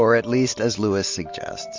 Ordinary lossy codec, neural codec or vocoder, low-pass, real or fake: MP3, 48 kbps; none; 7.2 kHz; real